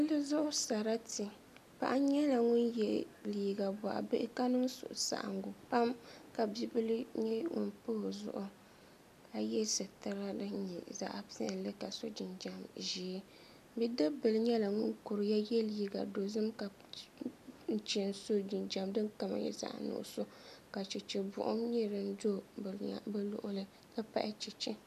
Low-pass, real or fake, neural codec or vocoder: 14.4 kHz; real; none